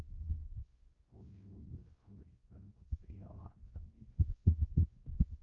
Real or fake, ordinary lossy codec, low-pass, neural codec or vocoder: fake; Opus, 32 kbps; 7.2 kHz; codec, 16 kHz, 0.5 kbps, X-Codec, WavLM features, trained on Multilingual LibriSpeech